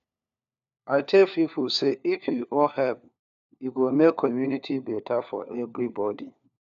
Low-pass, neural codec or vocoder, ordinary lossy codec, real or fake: 7.2 kHz; codec, 16 kHz, 4 kbps, FunCodec, trained on LibriTTS, 50 frames a second; AAC, 96 kbps; fake